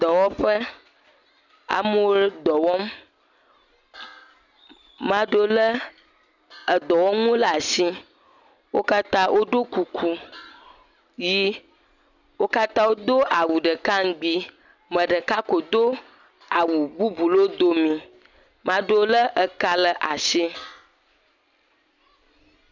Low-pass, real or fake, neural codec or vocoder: 7.2 kHz; real; none